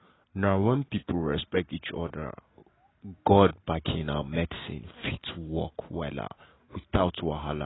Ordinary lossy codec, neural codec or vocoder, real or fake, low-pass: AAC, 16 kbps; none; real; 7.2 kHz